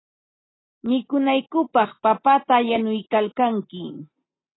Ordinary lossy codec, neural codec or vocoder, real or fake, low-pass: AAC, 16 kbps; none; real; 7.2 kHz